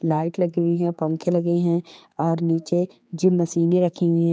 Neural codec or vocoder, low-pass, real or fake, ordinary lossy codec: codec, 16 kHz, 4 kbps, X-Codec, HuBERT features, trained on general audio; none; fake; none